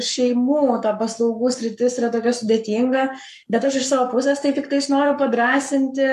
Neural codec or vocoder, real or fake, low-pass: codec, 44.1 kHz, 7.8 kbps, Pupu-Codec; fake; 14.4 kHz